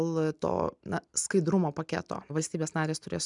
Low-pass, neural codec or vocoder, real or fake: 10.8 kHz; none; real